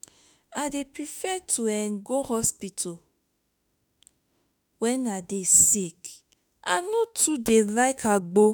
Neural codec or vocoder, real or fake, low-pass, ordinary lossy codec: autoencoder, 48 kHz, 32 numbers a frame, DAC-VAE, trained on Japanese speech; fake; none; none